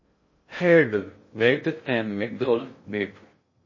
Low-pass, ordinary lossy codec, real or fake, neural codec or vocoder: 7.2 kHz; MP3, 32 kbps; fake; codec, 16 kHz in and 24 kHz out, 0.6 kbps, FocalCodec, streaming, 2048 codes